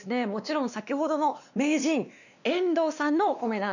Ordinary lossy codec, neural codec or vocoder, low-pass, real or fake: none; codec, 16 kHz, 2 kbps, X-Codec, WavLM features, trained on Multilingual LibriSpeech; 7.2 kHz; fake